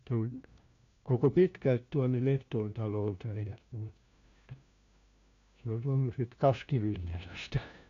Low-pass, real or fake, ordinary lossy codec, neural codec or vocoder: 7.2 kHz; fake; MP3, 64 kbps; codec, 16 kHz, 1 kbps, FunCodec, trained on LibriTTS, 50 frames a second